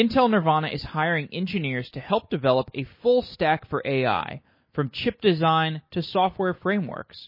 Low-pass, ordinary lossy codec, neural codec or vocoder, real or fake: 5.4 kHz; MP3, 24 kbps; none; real